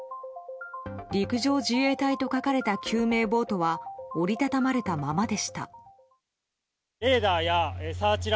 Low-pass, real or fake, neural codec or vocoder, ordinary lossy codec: none; real; none; none